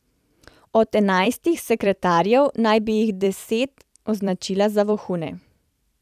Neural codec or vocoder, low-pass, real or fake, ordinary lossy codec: vocoder, 44.1 kHz, 128 mel bands every 256 samples, BigVGAN v2; 14.4 kHz; fake; none